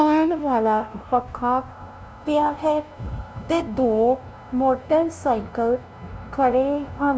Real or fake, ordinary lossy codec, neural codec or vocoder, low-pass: fake; none; codec, 16 kHz, 0.5 kbps, FunCodec, trained on LibriTTS, 25 frames a second; none